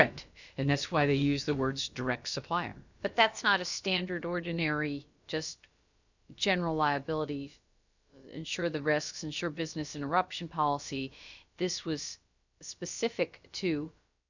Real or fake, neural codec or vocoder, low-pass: fake; codec, 16 kHz, about 1 kbps, DyCAST, with the encoder's durations; 7.2 kHz